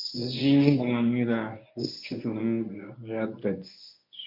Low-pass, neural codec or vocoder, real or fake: 5.4 kHz; codec, 24 kHz, 0.9 kbps, WavTokenizer, medium speech release version 1; fake